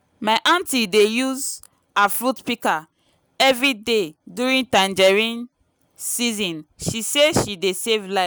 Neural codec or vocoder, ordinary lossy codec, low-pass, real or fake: none; none; none; real